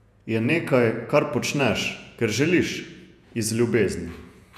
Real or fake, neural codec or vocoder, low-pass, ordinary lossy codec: fake; vocoder, 48 kHz, 128 mel bands, Vocos; 14.4 kHz; none